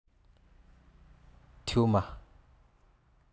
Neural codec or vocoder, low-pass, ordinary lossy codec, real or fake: none; none; none; real